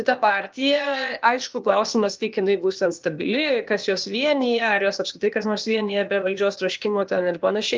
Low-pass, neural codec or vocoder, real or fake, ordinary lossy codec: 7.2 kHz; codec, 16 kHz, 0.8 kbps, ZipCodec; fake; Opus, 32 kbps